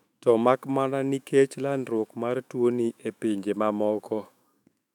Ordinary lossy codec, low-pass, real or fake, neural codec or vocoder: none; 19.8 kHz; fake; autoencoder, 48 kHz, 128 numbers a frame, DAC-VAE, trained on Japanese speech